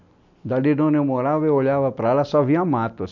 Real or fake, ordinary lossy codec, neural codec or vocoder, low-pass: real; none; none; 7.2 kHz